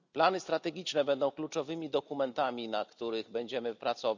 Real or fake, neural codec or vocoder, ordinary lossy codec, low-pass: real; none; none; 7.2 kHz